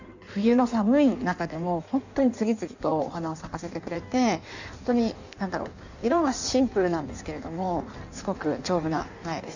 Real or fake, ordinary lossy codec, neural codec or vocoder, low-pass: fake; none; codec, 16 kHz in and 24 kHz out, 1.1 kbps, FireRedTTS-2 codec; 7.2 kHz